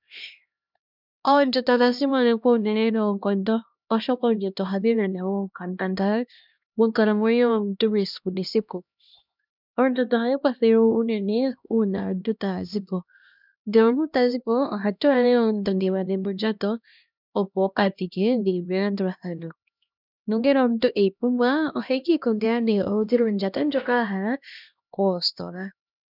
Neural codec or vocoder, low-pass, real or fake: codec, 16 kHz, 1 kbps, X-Codec, HuBERT features, trained on LibriSpeech; 5.4 kHz; fake